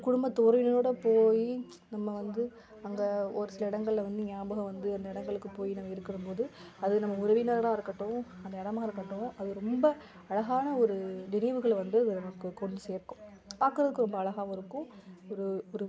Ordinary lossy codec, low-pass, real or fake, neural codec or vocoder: none; none; real; none